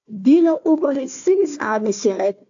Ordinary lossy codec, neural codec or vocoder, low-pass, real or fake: AAC, 64 kbps; codec, 16 kHz, 1 kbps, FunCodec, trained on Chinese and English, 50 frames a second; 7.2 kHz; fake